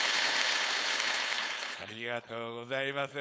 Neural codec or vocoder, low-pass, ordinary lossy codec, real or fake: codec, 16 kHz, 4.8 kbps, FACodec; none; none; fake